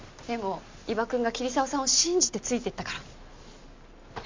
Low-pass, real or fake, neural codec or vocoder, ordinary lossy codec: 7.2 kHz; real; none; MP3, 48 kbps